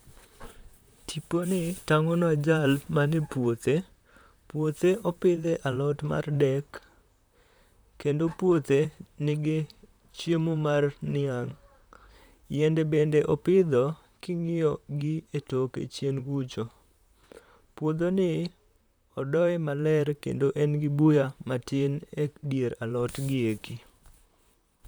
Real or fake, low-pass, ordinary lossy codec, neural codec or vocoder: fake; none; none; vocoder, 44.1 kHz, 128 mel bands, Pupu-Vocoder